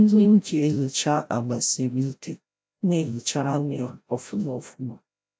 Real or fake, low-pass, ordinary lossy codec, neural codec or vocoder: fake; none; none; codec, 16 kHz, 0.5 kbps, FreqCodec, larger model